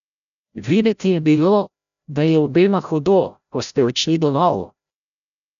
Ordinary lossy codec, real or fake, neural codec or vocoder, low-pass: none; fake; codec, 16 kHz, 0.5 kbps, FreqCodec, larger model; 7.2 kHz